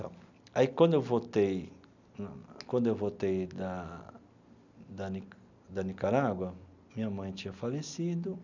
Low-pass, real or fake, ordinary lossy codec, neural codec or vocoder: 7.2 kHz; real; none; none